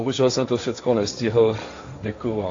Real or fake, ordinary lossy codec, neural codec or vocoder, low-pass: fake; AAC, 48 kbps; codec, 16 kHz, 1.1 kbps, Voila-Tokenizer; 7.2 kHz